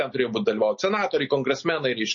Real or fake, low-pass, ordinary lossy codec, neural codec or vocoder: real; 7.2 kHz; MP3, 32 kbps; none